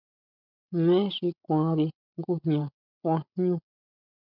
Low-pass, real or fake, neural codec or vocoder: 5.4 kHz; fake; codec, 16 kHz, 16 kbps, FreqCodec, larger model